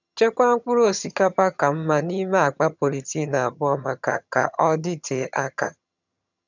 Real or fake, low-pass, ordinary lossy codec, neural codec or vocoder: fake; 7.2 kHz; none; vocoder, 22.05 kHz, 80 mel bands, HiFi-GAN